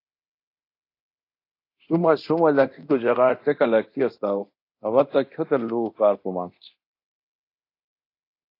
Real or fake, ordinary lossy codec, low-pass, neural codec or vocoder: fake; AAC, 32 kbps; 5.4 kHz; codec, 24 kHz, 0.9 kbps, DualCodec